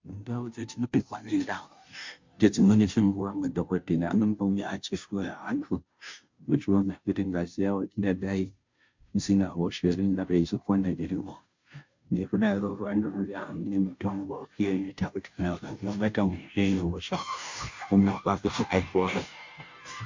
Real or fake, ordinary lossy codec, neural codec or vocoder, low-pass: fake; MP3, 64 kbps; codec, 16 kHz, 0.5 kbps, FunCodec, trained on Chinese and English, 25 frames a second; 7.2 kHz